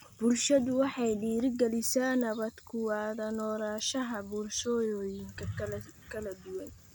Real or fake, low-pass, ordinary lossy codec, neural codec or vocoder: real; none; none; none